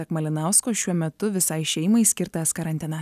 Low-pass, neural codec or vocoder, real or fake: 14.4 kHz; none; real